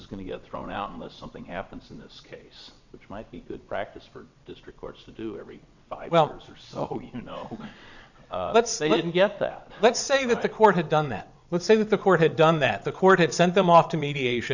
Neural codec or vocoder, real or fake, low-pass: vocoder, 22.05 kHz, 80 mel bands, WaveNeXt; fake; 7.2 kHz